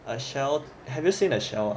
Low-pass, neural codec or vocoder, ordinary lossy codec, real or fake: none; none; none; real